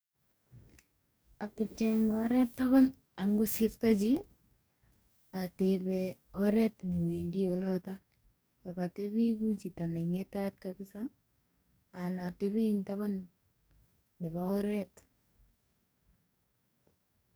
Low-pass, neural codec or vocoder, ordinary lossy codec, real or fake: none; codec, 44.1 kHz, 2.6 kbps, DAC; none; fake